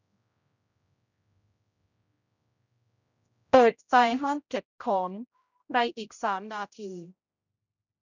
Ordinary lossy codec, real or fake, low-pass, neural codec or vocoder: none; fake; 7.2 kHz; codec, 16 kHz, 0.5 kbps, X-Codec, HuBERT features, trained on general audio